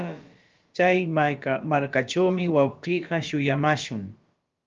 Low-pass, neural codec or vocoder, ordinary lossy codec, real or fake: 7.2 kHz; codec, 16 kHz, about 1 kbps, DyCAST, with the encoder's durations; Opus, 32 kbps; fake